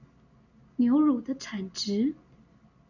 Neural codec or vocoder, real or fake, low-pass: none; real; 7.2 kHz